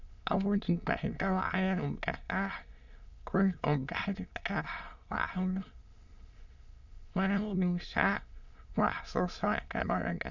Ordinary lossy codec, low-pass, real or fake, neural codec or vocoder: Opus, 64 kbps; 7.2 kHz; fake; autoencoder, 22.05 kHz, a latent of 192 numbers a frame, VITS, trained on many speakers